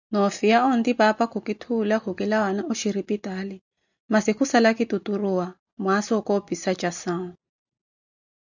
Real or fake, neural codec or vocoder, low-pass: real; none; 7.2 kHz